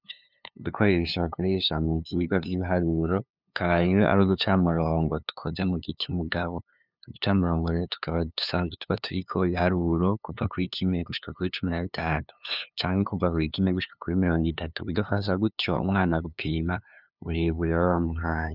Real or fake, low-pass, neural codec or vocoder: fake; 5.4 kHz; codec, 16 kHz, 2 kbps, FunCodec, trained on LibriTTS, 25 frames a second